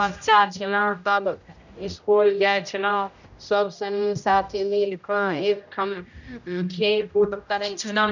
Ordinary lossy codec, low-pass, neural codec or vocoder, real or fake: none; 7.2 kHz; codec, 16 kHz, 0.5 kbps, X-Codec, HuBERT features, trained on general audio; fake